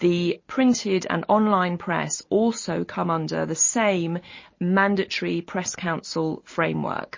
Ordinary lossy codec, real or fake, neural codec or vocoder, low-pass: MP3, 32 kbps; real; none; 7.2 kHz